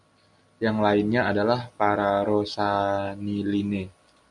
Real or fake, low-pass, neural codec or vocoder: real; 10.8 kHz; none